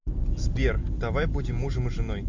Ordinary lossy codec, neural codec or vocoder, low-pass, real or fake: AAC, 48 kbps; none; 7.2 kHz; real